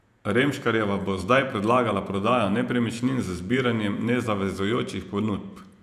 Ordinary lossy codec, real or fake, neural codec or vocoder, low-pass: none; fake; vocoder, 48 kHz, 128 mel bands, Vocos; 14.4 kHz